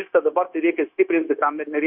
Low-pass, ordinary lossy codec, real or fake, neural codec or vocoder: 5.4 kHz; MP3, 32 kbps; fake; codec, 16 kHz, 0.9 kbps, LongCat-Audio-Codec